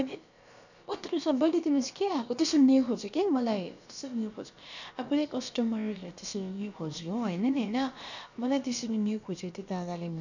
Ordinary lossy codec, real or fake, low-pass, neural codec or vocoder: none; fake; 7.2 kHz; codec, 16 kHz, about 1 kbps, DyCAST, with the encoder's durations